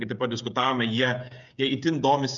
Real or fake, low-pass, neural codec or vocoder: fake; 7.2 kHz; codec, 16 kHz, 8 kbps, FreqCodec, smaller model